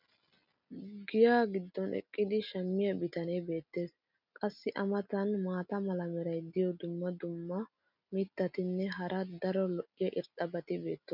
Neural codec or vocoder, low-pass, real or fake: none; 5.4 kHz; real